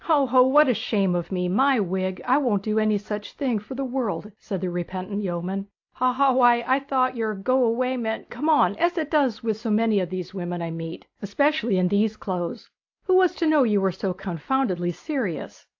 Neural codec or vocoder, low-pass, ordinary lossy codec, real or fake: none; 7.2 kHz; AAC, 48 kbps; real